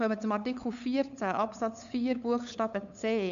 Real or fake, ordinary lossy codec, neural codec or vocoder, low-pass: fake; none; codec, 16 kHz, 8 kbps, FunCodec, trained on LibriTTS, 25 frames a second; 7.2 kHz